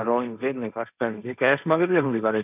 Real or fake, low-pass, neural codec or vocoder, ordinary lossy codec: fake; 3.6 kHz; codec, 16 kHz, 1.1 kbps, Voila-Tokenizer; none